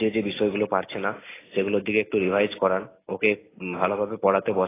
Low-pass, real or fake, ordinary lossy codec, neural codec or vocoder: 3.6 kHz; real; AAC, 16 kbps; none